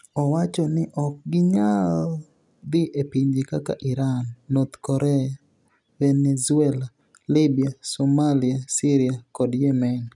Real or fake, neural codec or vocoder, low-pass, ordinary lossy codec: real; none; 10.8 kHz; none